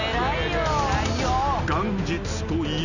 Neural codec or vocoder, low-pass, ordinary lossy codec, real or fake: none; 7.2 kHz; none; real